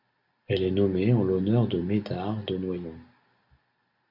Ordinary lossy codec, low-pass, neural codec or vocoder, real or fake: Opus, 64 kbps; 5.4 kHz; none; real